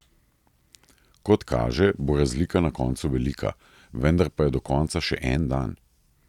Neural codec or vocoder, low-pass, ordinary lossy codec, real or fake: none; 19.8 kHz; none; real